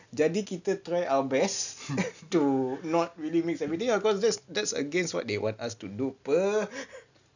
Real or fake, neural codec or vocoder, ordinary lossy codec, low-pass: real; none; none; 7.2 kHz